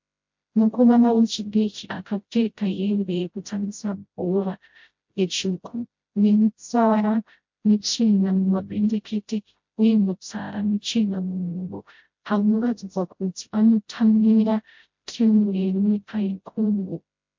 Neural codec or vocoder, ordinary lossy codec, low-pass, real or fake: codec, 16 kHz, 0.5 kbps, FreqCodec, smaller model; MP3, 48 kbps; 7.2 kHz; fake